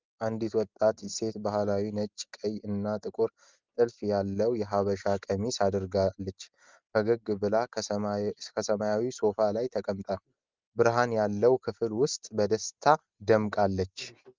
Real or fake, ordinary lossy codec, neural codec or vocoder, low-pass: real; Opus, 32 kbps; none; 7.2 kHz